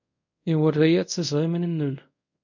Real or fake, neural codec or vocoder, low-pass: fake; codec, 24 kHz, 0.5 kbps, DualCodec; 7.2 kHz